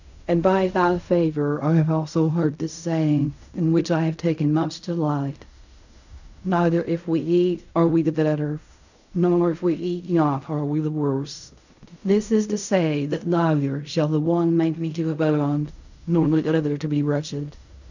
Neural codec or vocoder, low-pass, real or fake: codec, 16 kHz in and 24 kHz out, 0.4 kbps, LongCat-Audio-Codec, fine tuned four codebook decoder; 7.2 kHz; fake